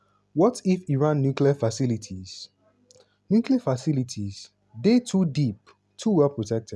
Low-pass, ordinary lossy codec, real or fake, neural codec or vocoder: none; none; real; none